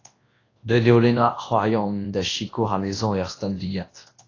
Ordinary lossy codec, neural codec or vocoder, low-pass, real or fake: AAC, 32 kbps; codec, 24 kHz, 0.9 kbps, WavTokenizer, large speech release; 7.2 kHz; fake